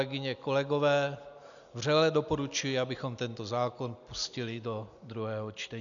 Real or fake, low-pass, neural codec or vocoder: real; 7.2 kHz; none